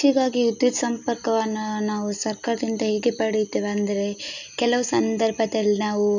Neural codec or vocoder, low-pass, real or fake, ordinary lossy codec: none; 7.2 kHz; real; none